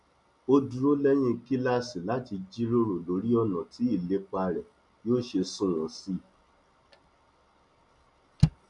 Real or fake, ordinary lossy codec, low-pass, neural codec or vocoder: real; none; 10.8 kHz; none